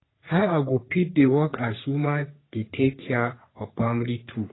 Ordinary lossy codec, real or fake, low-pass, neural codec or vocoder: AAC, 16 kbps; fake; 7.2 kHz; codec, 44.1 kHz, 3.4 kbps, Pupu-Codec